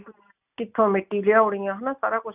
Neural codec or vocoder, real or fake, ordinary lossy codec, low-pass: none; real; none; 3.6 kHz